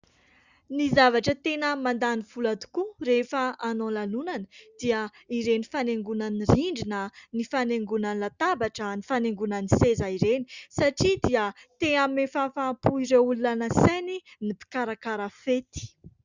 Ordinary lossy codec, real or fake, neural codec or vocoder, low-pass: Opus, 64 kbps; real; none; 7.2 kHz